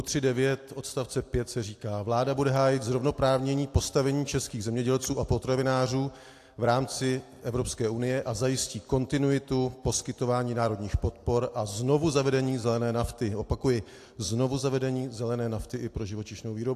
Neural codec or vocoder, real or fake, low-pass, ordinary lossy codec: none; real; 14.4 kHz; AAC, 64 kbps